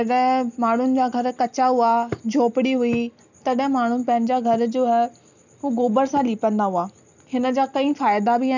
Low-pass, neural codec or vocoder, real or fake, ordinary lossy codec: 7.2 kHz; none; real; none